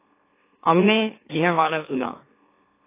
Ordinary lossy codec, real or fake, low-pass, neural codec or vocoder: AAC, 24 kbps; fake; 3.6 kHz; autoencoder, 44.1 kHz, a latent of 192 numbers a frame, MeloTTS